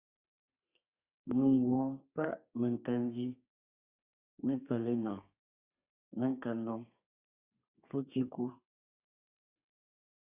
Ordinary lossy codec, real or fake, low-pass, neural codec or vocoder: Opus, 64 kbps; fake; 3.6 kHz; codec, 32 kHz, 1.9 kbps, SNAC